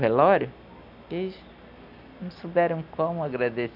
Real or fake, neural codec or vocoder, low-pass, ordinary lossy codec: real; none; 5.4 kHz; none